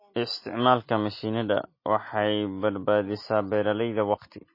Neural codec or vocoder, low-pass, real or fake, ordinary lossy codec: none; 5.4 kHz; real; MP3, 24 kbps